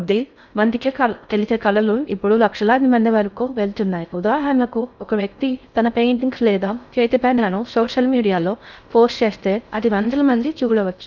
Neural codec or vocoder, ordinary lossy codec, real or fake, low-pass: codec, 16 kHz in and 24 kHz out, 0.6 kbps, FocalCodec, streaming, 4096 codes; none; fake; 7.2 kHz